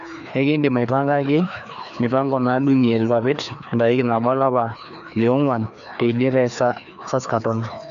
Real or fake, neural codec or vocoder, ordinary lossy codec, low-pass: fake; codec, 16 kHz, 2 kbps, FreqCodec, larger model; none; 7.2 kHz